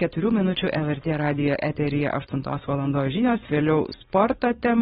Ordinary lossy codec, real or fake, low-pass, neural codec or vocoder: AAC, 16 kbps; fake; 7.2 kHz; codec, 16 kHz, 4.8 kbps, FACodec